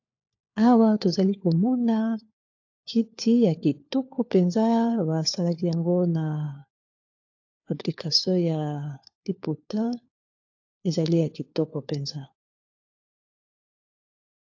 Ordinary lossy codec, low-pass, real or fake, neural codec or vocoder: AAC, 48 kbps; 7.2 kHz; fake; codec, 16 kHz, 4 kbps, FunCodec, trained on LibriTTS, 50 frames a second